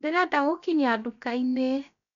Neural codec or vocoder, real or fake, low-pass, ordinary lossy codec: codec, 16 kHz, 0.7 kbps, FocalCodec; fake; 7.2 kHz; none